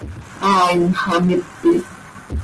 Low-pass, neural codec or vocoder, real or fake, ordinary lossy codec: 10.8 kHz; none; real; Opus, 16 kbps